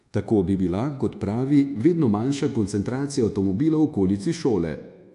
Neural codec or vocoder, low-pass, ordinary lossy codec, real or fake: codec, 24 kHz, 1.2 kbps, DualCodec; 10.8 kHz; AAC, 64 kbps; fake